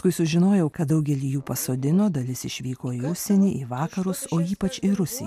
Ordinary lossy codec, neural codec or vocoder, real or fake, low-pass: MP3, 96 kbps; none; real; 14.4 kHz